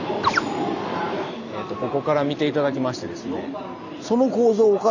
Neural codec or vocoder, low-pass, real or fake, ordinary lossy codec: none; 7.2 kHz; real; none